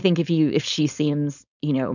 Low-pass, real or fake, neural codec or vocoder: 7.2 kHz; fake; codec, 16 kHz, 4.8 kbps, FACodec